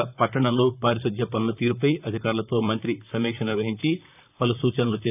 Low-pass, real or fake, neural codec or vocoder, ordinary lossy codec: 3.6 kHz; fake; vocoder, 44.1 kHz, 128 mel bands, Pupu-Vocoder; none